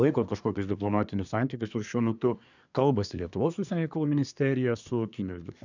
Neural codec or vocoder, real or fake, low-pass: codec, 24 kHz, 1 kbps, SNAC; fake; 7.2 kHz